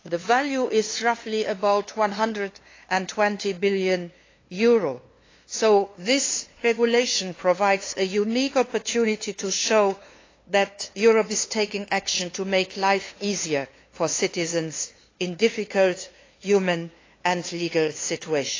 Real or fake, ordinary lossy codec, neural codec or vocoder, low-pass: fake; AAC, 32 kbps; codec, 16 kHz, 2 kbps, FunCodec, trained on LibriTTS, 25 frames a second; 7.2 kHz